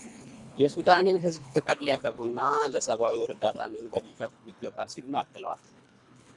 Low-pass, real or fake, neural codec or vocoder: 10.8 kHz; fake; codec, 24 kHz, 1.5 kbps, HILCodec